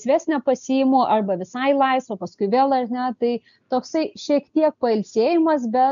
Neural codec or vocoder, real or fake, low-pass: none; real; 7.2 kHz